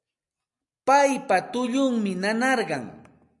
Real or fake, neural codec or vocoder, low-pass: real; none; 10.8 kHz